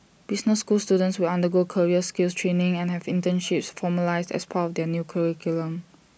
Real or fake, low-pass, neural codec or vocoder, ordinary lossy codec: real; none; none; none